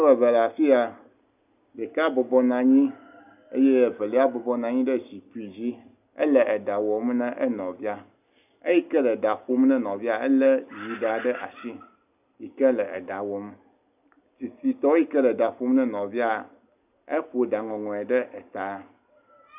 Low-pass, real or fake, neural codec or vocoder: 3.6 kHz; real; none